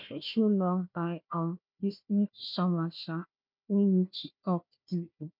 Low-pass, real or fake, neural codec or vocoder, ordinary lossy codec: 5.4 kHz; fake; codec, 16 kHz, 1 kbps, FunCodec, trained on Chinese and English, 50 frames a second; MP3, 48 kbps